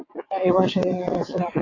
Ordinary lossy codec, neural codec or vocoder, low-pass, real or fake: AAC, 32 kbps; vocoder, 22.05 kHz, 80 mel bands, WaveNeXt; 7.2 kHz; fake